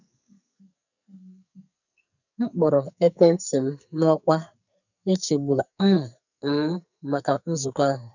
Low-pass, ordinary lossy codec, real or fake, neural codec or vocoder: 7.2 kHz; none; fake; codec, 44.1 kHz, 2.6 kbps, SNAC